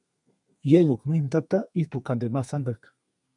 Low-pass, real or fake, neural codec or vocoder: 10.8 kHz; fake; codec, 32 kHz, 1.9 kbps, SNAC